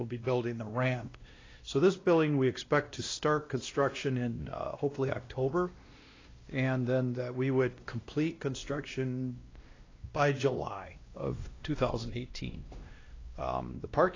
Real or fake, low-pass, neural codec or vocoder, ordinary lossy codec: fake; 7.2 kHz; codec, 16 kHz, 1 kbps, X-Codec, WavLM features, trained on Multilingual LibriSpeech; AAC, 32 kbps